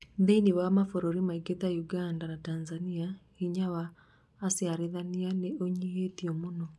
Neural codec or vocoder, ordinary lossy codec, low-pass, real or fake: none; none; none; real